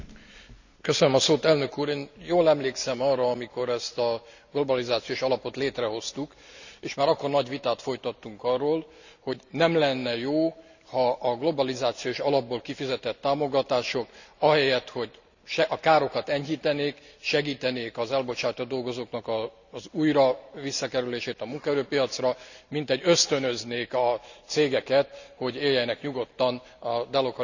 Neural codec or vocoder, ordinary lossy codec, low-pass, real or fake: none; none; 7.2 kHz; real